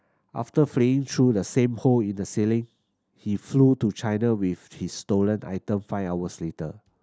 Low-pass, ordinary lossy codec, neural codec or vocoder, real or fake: none; none; none; real